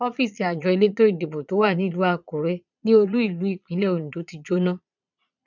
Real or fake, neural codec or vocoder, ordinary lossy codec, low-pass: fake; vocoder, 22.05 kHz, 80 mel bands, Vocos; none; 7.2 kHz